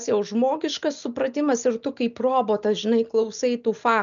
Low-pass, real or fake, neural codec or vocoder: 7.2 kHz; real; none